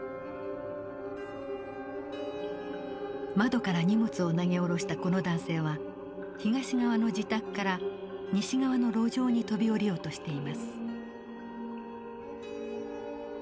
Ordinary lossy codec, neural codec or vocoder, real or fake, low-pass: none; none; real; none